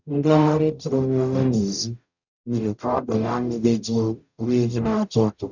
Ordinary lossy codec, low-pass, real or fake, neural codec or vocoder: none; 7.2 kHz; fake; codec, 44.1 kHz, 0.9 kbps, DAC